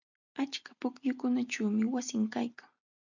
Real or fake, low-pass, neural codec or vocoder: real; 7.2 kHz; none